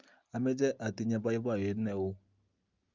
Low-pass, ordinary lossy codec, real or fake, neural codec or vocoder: 7.2 kHz; Opus, 24 kbps; real; none